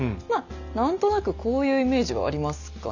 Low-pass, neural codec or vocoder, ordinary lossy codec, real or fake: 7.2 kHz; none; none; real